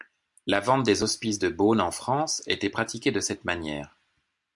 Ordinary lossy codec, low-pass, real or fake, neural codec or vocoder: MP3, 64 kbps; 10.8 kHz; real; none